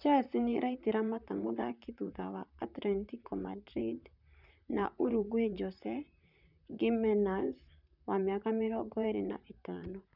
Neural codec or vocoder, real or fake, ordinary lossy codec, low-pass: vocoder, 44.1 kHz, 128 mel bands, Pupu-Vocoder; fake; none; 5.4 kHz